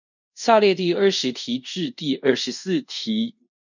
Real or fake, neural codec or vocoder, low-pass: fake; codec, 24 kHz, 0.5 kbps, DualCodec; 7.2 kHz